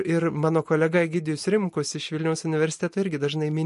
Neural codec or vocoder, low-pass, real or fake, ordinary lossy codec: none; 14.4 kHz; real; MP3, 48 kbps